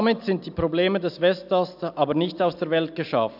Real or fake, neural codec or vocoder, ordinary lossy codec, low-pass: real; none; AAC, 48 kbps; 5.4 kHz